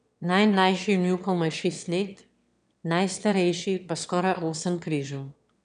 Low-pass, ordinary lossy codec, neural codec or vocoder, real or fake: 9.9 kHz; none; autoencoder, 22.05 kHz, a latent of 192 numbers a frame, VITS, trained on one speaker; fake